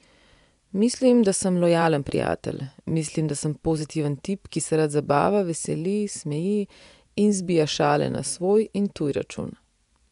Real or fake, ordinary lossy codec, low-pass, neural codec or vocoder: fake; none; 10.8 kHz; vocoder, 24 kHz, 100 mel bands, Vocos